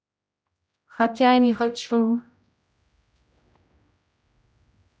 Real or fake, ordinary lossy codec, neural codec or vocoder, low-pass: fake; none; codec, 16 kHz, 0.5 kbps, X-Codec, HuBERT features, trained on general audio; none